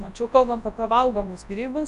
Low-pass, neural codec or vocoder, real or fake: 10.8 kHz; codec, 24 kHz, 0.9 kbps, WavTokenizer, large speech release; fake